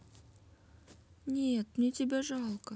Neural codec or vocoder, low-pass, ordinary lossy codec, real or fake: none; none; none; real